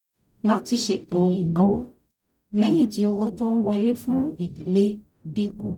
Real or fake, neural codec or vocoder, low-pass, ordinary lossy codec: fake; codec, 44.1 kHz, 0.9 kbps, DAC; 19.8 kHz; none